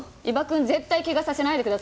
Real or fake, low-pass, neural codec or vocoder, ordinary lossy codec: real; none; none; none